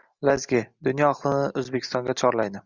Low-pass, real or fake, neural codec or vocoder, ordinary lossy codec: 7.2 kHz; real; none; Opus, 64 kbps